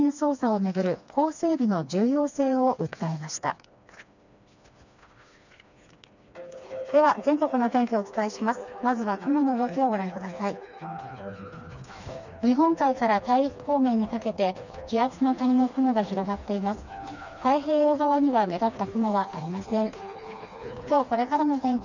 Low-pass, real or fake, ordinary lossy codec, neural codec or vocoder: 7.2 kHz; fake; none; codec, 16 kHz, 2 kbps, FreqCodec, smaller model